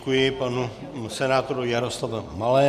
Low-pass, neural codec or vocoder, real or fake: 14.4 kHz; none; real